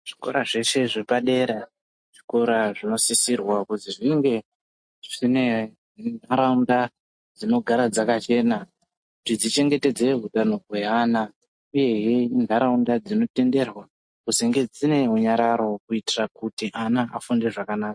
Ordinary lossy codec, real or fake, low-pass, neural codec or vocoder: MP3, 48 kbps; real; 9.9 kHz; none